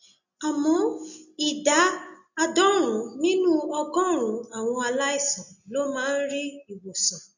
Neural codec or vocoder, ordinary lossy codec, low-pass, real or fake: none; none; 7.2 kHz; real